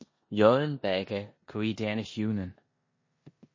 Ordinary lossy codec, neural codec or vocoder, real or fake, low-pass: MP3, 32 kbps; codec, 16 kHz in and 24 kHz out, 0.9 kbps, LongCat-Audio-Codec, four codebook decoder; fake; 7.2 kHz